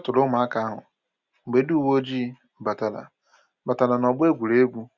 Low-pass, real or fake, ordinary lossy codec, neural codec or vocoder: 7.2 kHz; real; Opus, 64 kbps; none